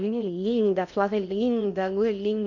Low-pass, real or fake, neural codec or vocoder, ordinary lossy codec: 7.2 kHz; fake; codec, 16 kHz in and 24 kHz out, 0.6 kbps, FocalCodec, streaming, 2048 codes; none